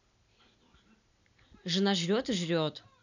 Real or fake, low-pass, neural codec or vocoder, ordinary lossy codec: real; 7.2 kHz; none; none